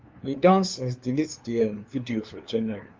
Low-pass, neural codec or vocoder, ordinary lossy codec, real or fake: 7.2 kHz; codec, 16 kHz, 2 kbps, FunCodec, trained on LibriTTS, 25 frames a second; Opus, 24 kbps; fake